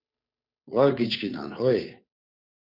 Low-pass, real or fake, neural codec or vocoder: 5.4 kHz; fake; codec, 16 kHz, 2 kbps, FunCodec, trained on Chinese and English, 25 frames a second